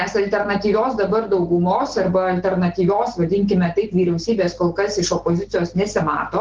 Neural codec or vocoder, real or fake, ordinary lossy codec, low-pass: none; real; Opus, 16 kbps; 7.2 kHz